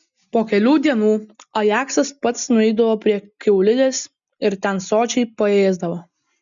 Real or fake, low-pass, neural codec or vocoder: real; 7.2 kHz; none